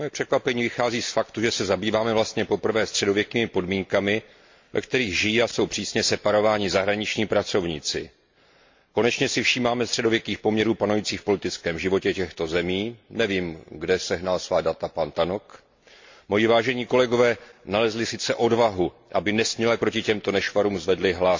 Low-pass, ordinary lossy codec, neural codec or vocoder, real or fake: 7.2 kHz; MP3, 48 kbps; none; real